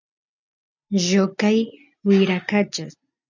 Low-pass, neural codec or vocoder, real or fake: 7.2 kHz; none; real